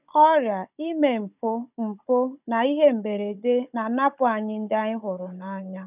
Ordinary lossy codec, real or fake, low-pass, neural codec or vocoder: none; fake; 3.6 kHz; codec, 16 kHz, 16 kbps, FunCodec, trained on Chinese and English, 50 frames a second